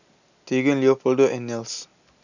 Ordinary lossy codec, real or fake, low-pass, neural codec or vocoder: none; real; 7.2 kHz; none